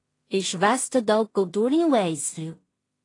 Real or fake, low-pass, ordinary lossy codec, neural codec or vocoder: fake; 10.8 kHz; AAC, 32 kbps; codec, 16 kHz in and 24 kHz out, 0.4 kbps, LongCat-Audio-Codec, two codebook decoder